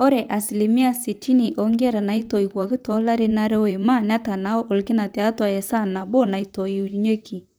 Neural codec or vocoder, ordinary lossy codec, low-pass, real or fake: vocoder, 44.1 kHz, 128 mel bands, Pupu-Vocoder; none; none; fake